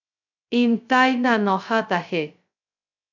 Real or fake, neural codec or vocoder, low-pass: fake; codec, 16 kHz, 0.2 kbps, FocalCodec; 7.2 kHz